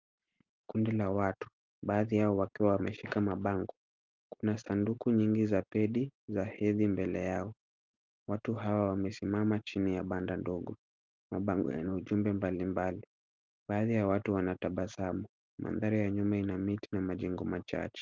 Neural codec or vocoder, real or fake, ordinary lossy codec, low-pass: none; real; Opus, 24 kbps; 7.2 kHz